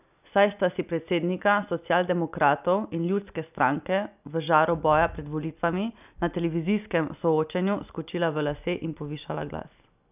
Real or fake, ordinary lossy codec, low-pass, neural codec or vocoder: real; none; 3.6 kHz; none